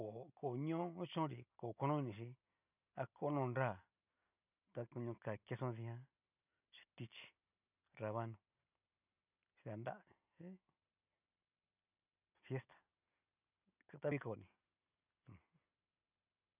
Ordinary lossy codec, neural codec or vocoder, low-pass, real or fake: none; none; 3.6 kHz; real